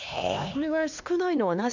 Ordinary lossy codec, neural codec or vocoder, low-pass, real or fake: none; codec, 16 kHz, 2 kbps, X-Codec, HuBERT features, trained on LibriSpeech; 7.2 kHz; fake